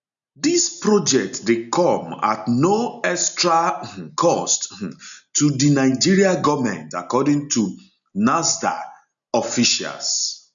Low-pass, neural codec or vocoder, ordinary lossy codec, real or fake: 7.2 kHz; none; none; real